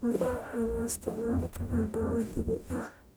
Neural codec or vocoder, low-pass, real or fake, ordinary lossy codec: codec, 44.1 kHz, 0.9 kbps, DAC; none; fake; none